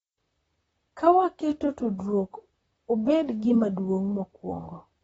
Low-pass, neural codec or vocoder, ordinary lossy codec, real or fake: 19.8 kHz; vocoder, 44.1 kHz, 128 mel bands, Pupu-Vocoder; AAC, 24 kbps; fake